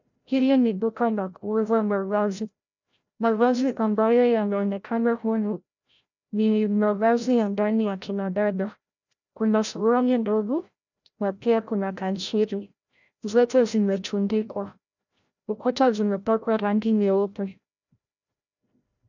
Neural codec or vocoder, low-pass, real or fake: codec, 16 kHz, 0.5 kbps, FreqCodec, larger model; 7.2 kHz; fake